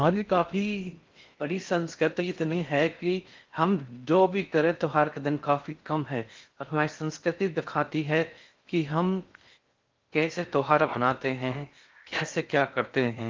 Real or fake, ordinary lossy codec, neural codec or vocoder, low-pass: fake; Opus, 24 kbps; codec, 16 kHz in and 24 kHz out, 0.6 kbps, FocalCodec, streaming, 4096 codes; 7.2 kHz